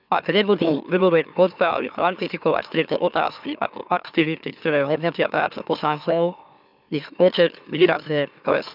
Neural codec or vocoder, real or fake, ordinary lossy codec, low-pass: autoencoder, 44.1 kHz, a latent of 192 numbers a frame, MeloTTS; fake; none; 5.4 kHz